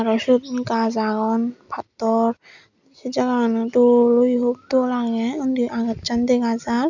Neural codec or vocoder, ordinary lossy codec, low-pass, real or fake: none; none; 7.2 kHz; real